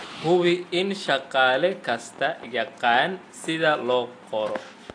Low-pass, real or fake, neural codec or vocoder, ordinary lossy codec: 9.9 kHz; real; none; AAC, 48 kbps